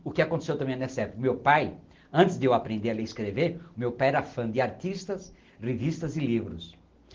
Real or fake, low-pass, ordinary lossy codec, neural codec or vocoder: real; 7.2 kHz; Opus, 16 kbps; none